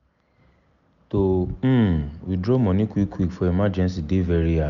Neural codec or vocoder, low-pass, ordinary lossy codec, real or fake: none; 7.2 kHz; none; real